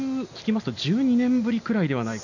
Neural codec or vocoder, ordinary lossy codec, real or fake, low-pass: none; none; real; 7.2 kHz